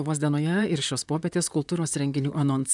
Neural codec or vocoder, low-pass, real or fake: vocoder, 44.1 kHz, 128 mel bands, Pupu-Vocoder; 10.8 kHz; fake